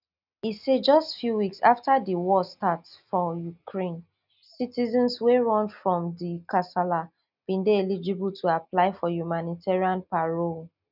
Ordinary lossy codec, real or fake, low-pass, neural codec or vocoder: none; real; 5.4 kHz; none